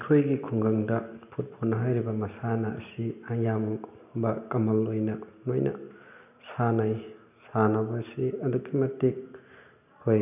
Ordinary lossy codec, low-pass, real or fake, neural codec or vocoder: none; 3.6 kHz; real; none